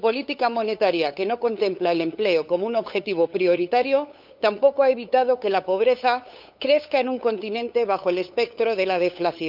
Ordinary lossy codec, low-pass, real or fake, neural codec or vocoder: none; 5.4 kHz; fake; codec, 16 kHz, 8 kbps, FunCodec, trained on LibriTTS, 25 frames a second